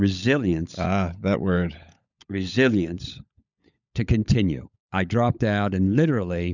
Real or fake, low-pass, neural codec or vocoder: fake; 7.2 kHz; codec, 16 kHz, 16 kbps, FunCodec, trained on LibriTTS, 50 frames a second